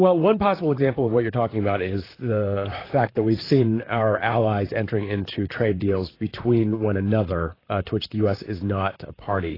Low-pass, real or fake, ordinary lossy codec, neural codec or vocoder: 5.4 kHz; fake; AAC, 24 kbps; vocoder, 44.1 kHz, 128 mel bands, Pupu-Vocoder